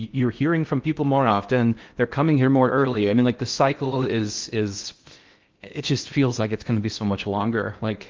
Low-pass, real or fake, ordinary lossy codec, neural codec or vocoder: 7.2 kHz; fake; Opus, 32 kbps; codec, 16 kHz in and 24 kHz out, 0.6 kbps, FocalCodec, streaming, 4096 codes